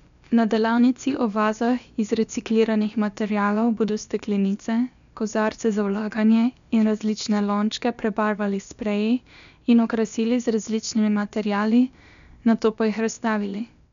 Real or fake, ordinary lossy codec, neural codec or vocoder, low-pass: fake; none; codec, 16 kHz, about 1 kbps, DyCAST, with the encoder's durations; 7.2 kHz